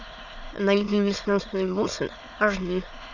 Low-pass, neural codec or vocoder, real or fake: 7.2 kHz; autoencoder, 22.05 kHz, a latent of 192 numbers a frame, VITS, trained on many speakers; fake